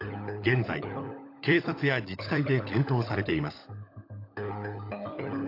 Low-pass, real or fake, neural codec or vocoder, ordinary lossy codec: 5.4 kHz; fake; codec, 16 kHz, 16 kbps, FunCodec, trained on LibriTTS, 50 frames a second; AAC, 32 kbps